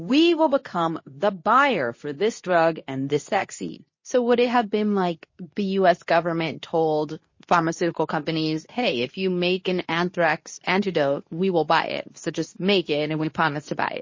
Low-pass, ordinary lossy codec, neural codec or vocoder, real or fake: 7.2 kHz; MP3, 32 kbps; codec, 24 kHz, 0.9 kbps, WavTokenizer, medium speech release version 2; fake